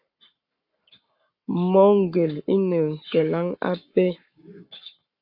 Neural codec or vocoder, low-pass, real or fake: codec, 44.1 kHz, 7.8 kbps, Pupu-Codec; 5.4 kHz; fake